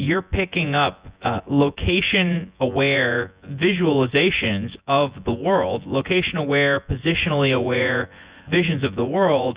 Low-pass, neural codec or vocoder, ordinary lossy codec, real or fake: 3.6 kHz; vocoder, 24 kHz, 100 mel bands, Vocos; Opus, 64 kbps; fake